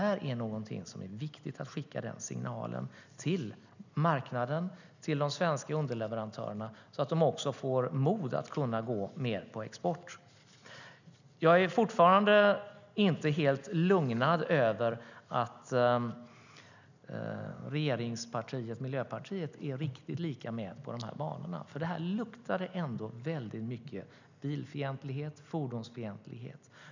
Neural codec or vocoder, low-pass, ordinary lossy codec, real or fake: none; 7.2 kHz; none; real